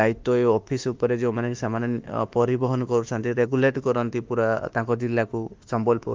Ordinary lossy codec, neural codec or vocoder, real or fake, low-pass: Opus, 32 kbps; autoencoder, 48 kHz, 32 numbers a frame, DAC-VAE, trained on Japanese speech; fake; 7.2 kHz